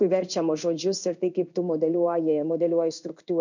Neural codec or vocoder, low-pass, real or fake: codec, 16 kHz in and 24 kHz out, 1 kbps, XY-Tokenizer; 7.2 kHz; fake